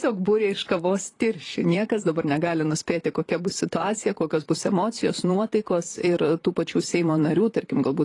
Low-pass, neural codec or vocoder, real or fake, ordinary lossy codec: 10.8 kHz; vocoder, 44.1 kHz, 128 mel bands, Pupu-Vocoder; fake; AAC, 32 kbps